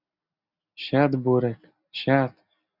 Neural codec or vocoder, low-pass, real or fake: none; 5.4 kHz; real